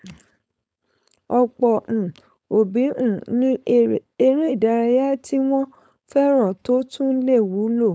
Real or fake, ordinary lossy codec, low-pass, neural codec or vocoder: fake; none; none; codec, 16 kHz, 4.8 kbps, FACodec